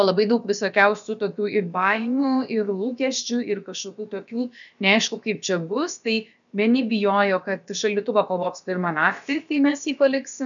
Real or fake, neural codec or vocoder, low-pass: fake; codec, 16 kHz, about 1 kbps, DyCAST, with the encoder's durations; 7.2 kHz